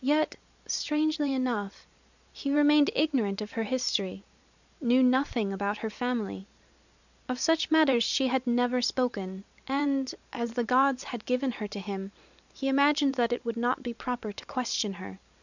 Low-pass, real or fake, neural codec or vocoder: 7.2 kHz; fake; vocoder, 44.1 kHz, 128 mel bands every 512 samples, BigVGAN v2